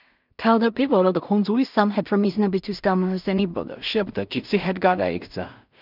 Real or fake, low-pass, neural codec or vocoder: fake; 5.4 kHz; codec, 16 kHz in and 24 kHz out, 0.4 kbps, LongCat-Audio-Codec, two codebook decoder